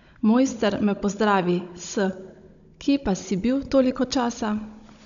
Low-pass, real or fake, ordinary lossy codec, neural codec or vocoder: 7.2 kHz; fake; none; codec, 16 kHz, 16 kbps, FunCodec, trained on Chinese and English, 50 frames a second